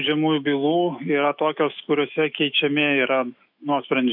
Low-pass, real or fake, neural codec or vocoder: 5.4 kHz; fake; autoencoder, 48 kHz, 128 numbers a frame, DAC-VAE, trained on Japanese speech